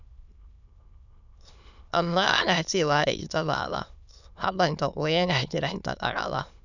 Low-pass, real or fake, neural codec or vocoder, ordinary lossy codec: 7.2 kHz; fake; autoencoder, 22.05 kHz, a latent of 192 numbers a frame, VITS, trained on many speakers; none